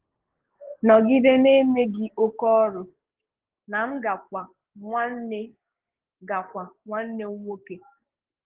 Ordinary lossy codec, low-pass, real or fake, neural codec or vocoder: Opus, 16 kbps; 3.6 kHz; fake; codec, 16 kHz, 6 kbps, DAC